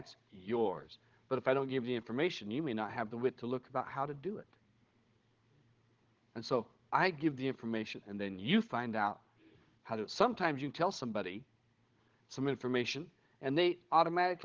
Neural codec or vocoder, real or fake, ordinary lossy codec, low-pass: codec, 16 kHz, 16 kbps, FunCodec, trained on Chinese and English, 50 frames a second; fake; Opus, 16 kbps; 7.2 kHz